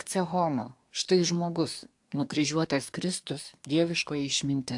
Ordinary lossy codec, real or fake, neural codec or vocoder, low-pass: AAC, 64 kbps; fake; codec, 24 kHz, 1 kbps, SNAC; 10.8 kHz